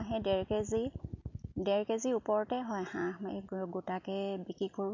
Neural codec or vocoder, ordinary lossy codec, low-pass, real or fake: none; MP3, 64 kbps; 7.2 kHz; real